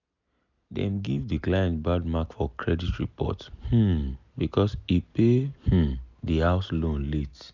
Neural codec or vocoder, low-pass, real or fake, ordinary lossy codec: none; 7.2 kHz; real; none